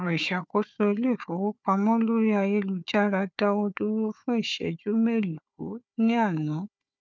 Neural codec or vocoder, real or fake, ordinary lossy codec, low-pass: codec, 16 kHz, 4 kbps, FunCodec, trained on Chinese and English, 50 frames a second; fake; none; none